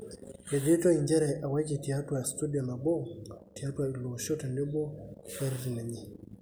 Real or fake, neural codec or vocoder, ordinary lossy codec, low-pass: real; none; none; none